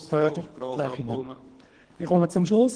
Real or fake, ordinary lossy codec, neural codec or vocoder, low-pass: fake; Opus, 16 kbps; codec, 24 kHz, 3 kbps, HILCodec; 9.9 kHz